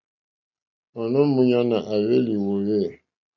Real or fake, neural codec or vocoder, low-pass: real; none; 7.2 kHz